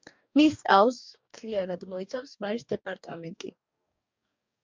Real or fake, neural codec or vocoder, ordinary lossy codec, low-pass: fake; codec, 44.1 kHz, 2.6 kbps, DAC; MP3, 64 kbps; 7.2 kHz